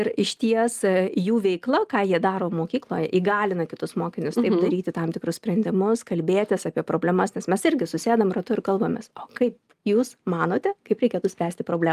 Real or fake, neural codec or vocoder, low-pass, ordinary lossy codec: real; none; 14.4 kHz; Opus, 32 kbps